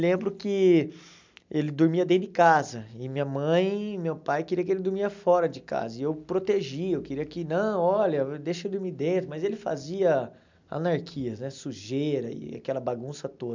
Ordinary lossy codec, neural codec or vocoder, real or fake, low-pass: MP3, 64 kbps; none; real; 7.2 kHz